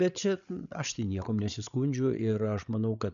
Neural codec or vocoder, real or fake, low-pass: codec, 16 kHz, 8 kbps, FreqCodec, larger model; fake; 7.2 kHz